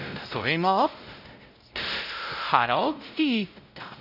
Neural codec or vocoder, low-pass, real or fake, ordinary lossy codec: codec, 16 kHz, 0.5 kbps, X-Codec, WavLM features, trained on Multilingual LibriSpeech; 5.4 kHz; fake; none